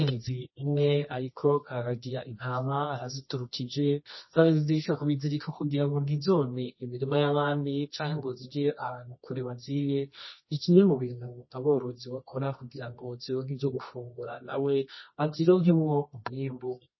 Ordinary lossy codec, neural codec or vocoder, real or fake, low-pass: MP3, 24 kbps; codec, 24 kHz, 0.9 kbps, WavTokenizer, medium music audio release; fake; 7.2 kHz